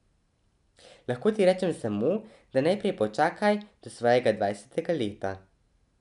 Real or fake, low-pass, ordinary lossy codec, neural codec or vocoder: real; 10.8 kHz; none; none